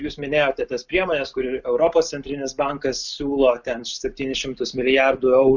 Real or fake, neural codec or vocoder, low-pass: real; none; 7.2 kHz